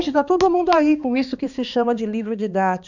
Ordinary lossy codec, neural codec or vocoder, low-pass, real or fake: none; codec, 16 kHz, 2 kbps, X-Codec, HuBERT features, trained on balanced general audio; 7.2 kHz; fake